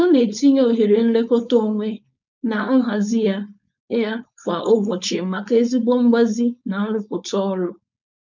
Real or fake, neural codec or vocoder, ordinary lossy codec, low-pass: fake; codec, 16 kHz, 4.8 kbps, FACodec; none; 7.2 kHz